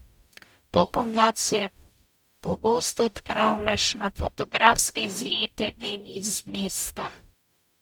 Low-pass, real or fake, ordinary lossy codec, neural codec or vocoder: none; fake; none; codec, 44.1 kHz, 0.9 kbps, DAC